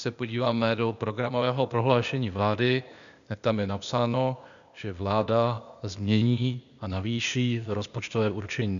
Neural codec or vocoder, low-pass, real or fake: codec, 16 kHz, 0.8 kbps, ZipCodec; 7.2 kHz; fake